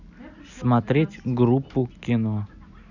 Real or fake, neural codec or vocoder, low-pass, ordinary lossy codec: real; none; 7.2 kHz; none